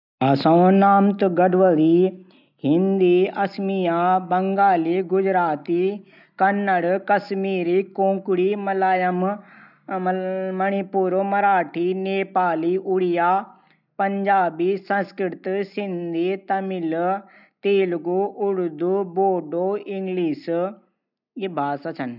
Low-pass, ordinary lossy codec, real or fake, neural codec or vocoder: 5.4 kHz; none; real; none